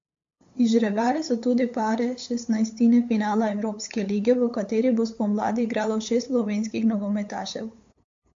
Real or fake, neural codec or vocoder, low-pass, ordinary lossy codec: fake; codec, 16 kHz, 8 kbps, FunCodec, trained on LibriTTS, 25 frames a second; 7.2 kHz; MP3, 48 kbps